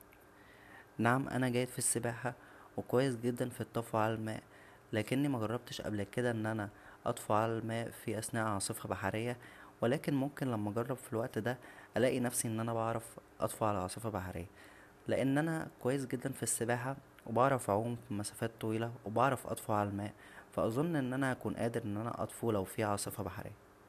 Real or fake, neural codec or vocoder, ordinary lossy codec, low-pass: real; none; none; 14.4 kHz